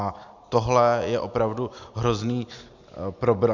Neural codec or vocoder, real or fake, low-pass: none; real; 7.2 kHz